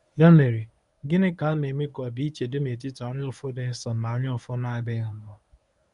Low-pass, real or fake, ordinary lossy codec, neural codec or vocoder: 10.8 kHz; fake; none; codec, 24 kHz, 0.9 kbps, WavTokenizer, medium speech release version 1